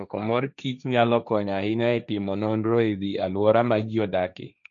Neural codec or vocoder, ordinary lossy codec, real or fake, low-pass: codec, 16 kHz, 1.1 kbps, Voila-Tokenizer; none; fake; 7.2 kHz